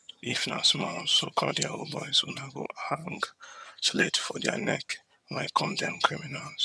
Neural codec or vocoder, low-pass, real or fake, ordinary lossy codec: vocoder, 22.05 kHz, 80 mel bands, HiFi-GAN; none; fake; none